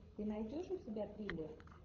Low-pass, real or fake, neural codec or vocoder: 7.2 kHz; fake; codec, 24 kHz, 6 kbps, HILCodec